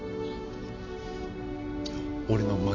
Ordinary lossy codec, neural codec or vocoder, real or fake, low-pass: none; none; real; 7.2 kHz